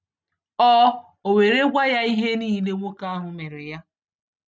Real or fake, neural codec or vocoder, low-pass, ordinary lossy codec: real; none; none; none